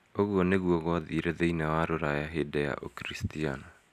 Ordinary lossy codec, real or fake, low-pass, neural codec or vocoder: none; real; 14.4 kHz; none